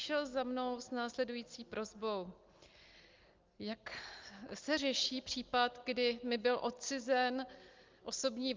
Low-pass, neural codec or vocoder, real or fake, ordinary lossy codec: 7.2 kHz; none; real; Opus, 32 kbps